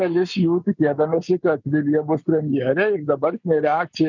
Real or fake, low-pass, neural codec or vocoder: real; 7.2 kHz; none